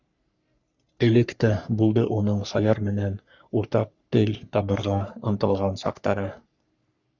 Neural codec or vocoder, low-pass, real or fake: codec, 44.1 kHz, 3.4 kbps, Pupu-Codec; 7.2 kHz; fake